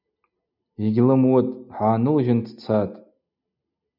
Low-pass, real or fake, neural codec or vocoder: 5.4 kHz; real; none